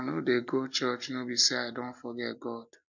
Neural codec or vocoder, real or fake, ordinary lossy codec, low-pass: none; real; none; 7.2 kHz